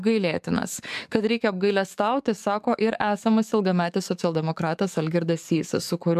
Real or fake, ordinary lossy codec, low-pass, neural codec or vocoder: fake; MP3, 96 kbps; 14.4 kHz; codec, 44.1 kHz, 7.8 kbps, DAC